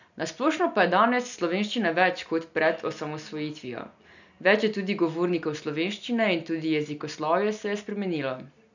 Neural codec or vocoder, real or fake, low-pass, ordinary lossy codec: none; real; 7.2 kHz; none